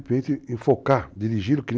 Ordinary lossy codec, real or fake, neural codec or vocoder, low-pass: none; real; none; none